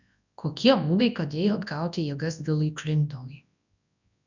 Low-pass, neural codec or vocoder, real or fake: 7.2 kHz; codec, 24 kHz, 0.9 kbps, WavTokenizer, large speech release; fake